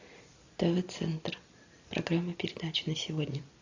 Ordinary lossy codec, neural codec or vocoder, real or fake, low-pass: AAC, 48 kbps; none; real; 7.2 kHz